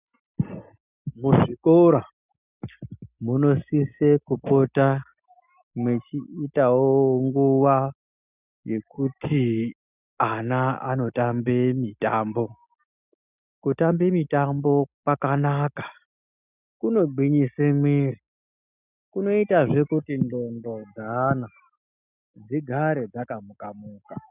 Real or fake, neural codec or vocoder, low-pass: real; none; 3.6 kHz